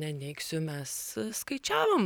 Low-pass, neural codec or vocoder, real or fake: 19.8 kHz; vocoder, 44.1 kHz, 128 mel bands every 512 samples, BigVGAN v2; fake